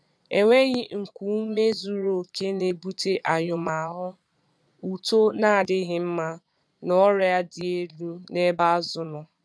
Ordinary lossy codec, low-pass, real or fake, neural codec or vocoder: none; none; fake; vocoder, 22.05 kHz, 80 mel bands, Vocos